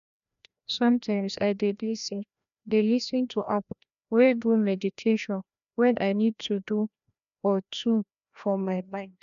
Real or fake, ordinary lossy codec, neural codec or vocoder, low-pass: fake; none; codec, 16 kHz, 1 kbps, FreqCodec, larger model; 7.2 kHz